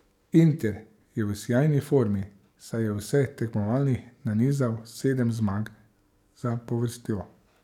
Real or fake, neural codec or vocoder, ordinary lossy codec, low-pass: fake; codec, 44.1 kHz, 7.8 kbps, DAC; none; 19.8 kHz